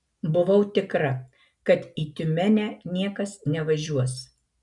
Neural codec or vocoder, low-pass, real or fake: none; 10.8 kHz; real